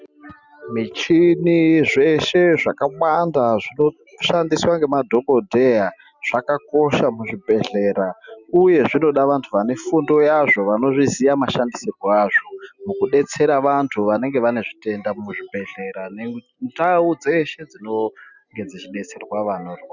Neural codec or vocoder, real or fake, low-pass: none; real; 7.2 kHz